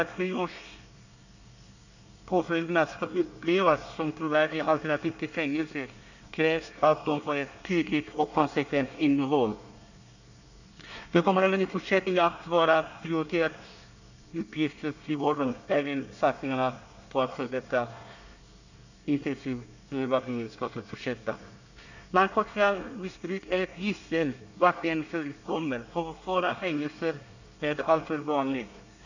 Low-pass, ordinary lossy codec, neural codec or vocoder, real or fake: 7.2 kHz; none; codec, 24 kHz, 1 kbps, SNAC; fake